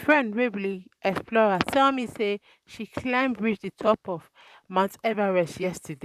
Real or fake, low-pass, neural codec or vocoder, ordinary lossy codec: fake; 14.4 kHz; vocoder, 44.1 kHz, 128 mel bands, Pupu-Vocoder; none